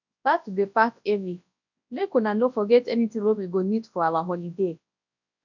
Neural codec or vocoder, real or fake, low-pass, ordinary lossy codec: codec, 24 kHz, 0.9 kbps, WavTokenizer, large speech release; fake; 7.2 kHz; none